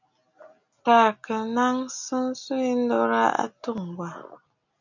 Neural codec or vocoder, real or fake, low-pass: none; real; 7.2 kHz